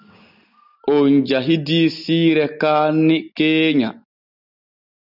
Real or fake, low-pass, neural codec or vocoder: real; 5.4 kHz; none